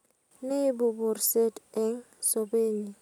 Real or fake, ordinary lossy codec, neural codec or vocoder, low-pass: real; none; none; 19.8 kHz